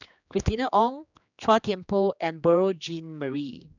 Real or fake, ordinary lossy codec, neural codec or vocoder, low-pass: fake; none; codec, 16 kHz, 2 kbps, X-Codec, HuBERT features, trained on general audio; 7.2 kHz